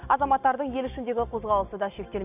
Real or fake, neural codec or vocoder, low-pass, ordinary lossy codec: real; none; 3.6 kHz; none